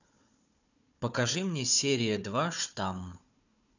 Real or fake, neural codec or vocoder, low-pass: fake; codec, 16 kHz, 4 kbps, FunCodec, trained on Chinese and English, 50 frames a second; 7.2 kHz